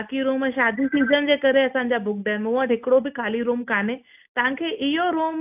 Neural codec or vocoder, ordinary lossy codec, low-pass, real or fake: none; AAC, 32 kbps; 3.6 kHz; real